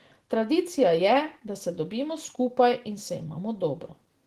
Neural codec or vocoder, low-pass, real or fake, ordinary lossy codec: none; 14.4 kHz; real; Opus, 16 kbps